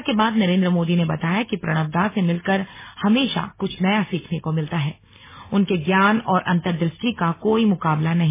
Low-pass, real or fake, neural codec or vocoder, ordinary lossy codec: 3.6 kHz; real; none; MP3, 16 kbps